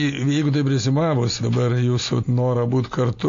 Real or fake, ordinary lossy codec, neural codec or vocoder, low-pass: real; AAC, 48 kbps; none; 7.2 kHz